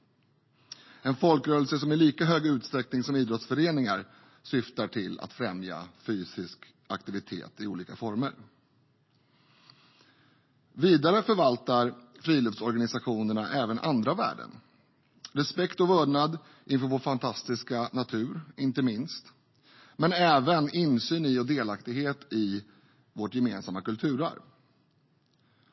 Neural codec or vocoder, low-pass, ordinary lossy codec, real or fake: none; 7.2 kHz; MP3, 24 kbps; real